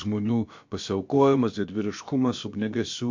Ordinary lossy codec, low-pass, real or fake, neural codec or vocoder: MP3, 48 kbps; 7.2 kHz; fake; codec, 16 kHz, about 1 kbps, DyCAST, with the encoder's durations